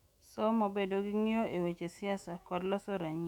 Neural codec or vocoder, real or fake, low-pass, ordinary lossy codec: autoencoder, 48 kHz, 128 numbers a frame, DAC-VAE, trained on Japanese speech; fake; 19.8 kHz; none